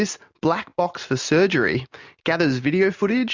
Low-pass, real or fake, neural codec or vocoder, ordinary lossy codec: 7.2 kHz; real; none; MP3, 64 kbps